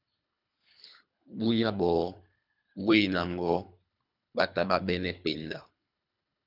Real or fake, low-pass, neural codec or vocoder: fake; 5.4 kHz; codec, 24 kHz, 3 kbps, HILCodec